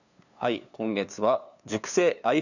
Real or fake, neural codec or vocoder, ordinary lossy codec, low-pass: fake; codec, 16 kHz, 2 kbps, FunCodec, trained on LibriTTS, 25 frames a second; none; 7.2 kHz